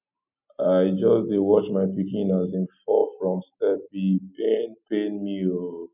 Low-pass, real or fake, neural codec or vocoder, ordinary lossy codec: 3.6 kHz; real; none; none